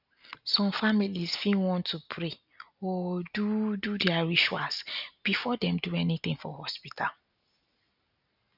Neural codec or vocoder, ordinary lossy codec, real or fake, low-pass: none; none; real; 5.4 kHz